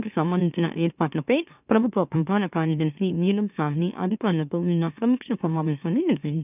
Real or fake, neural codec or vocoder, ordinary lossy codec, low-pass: fake; autoencoder, 44.1 kHz, a latent of 192 numbers a frame, MeloTTS; none; 3.6 kHz